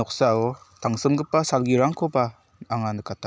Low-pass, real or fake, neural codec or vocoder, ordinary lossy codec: none; real; none; none